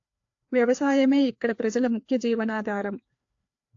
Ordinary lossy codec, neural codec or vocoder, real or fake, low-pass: AAC, 48 kbps; codec, 16 kHz, 2 kbps, FreqCodec, larger model; fake; 7.2 kHz